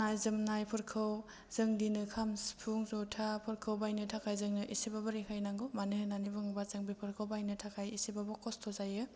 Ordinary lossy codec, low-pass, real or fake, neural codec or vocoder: none; none; real; none